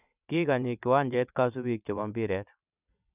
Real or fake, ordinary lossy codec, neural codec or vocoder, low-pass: fake; none; vocoder, 22.05 kHz, 80 mel bands, WaveNeXt; 3.6 kHz